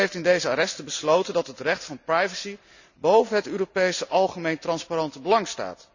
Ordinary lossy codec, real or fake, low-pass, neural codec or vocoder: none; real; 7.2 kHz; none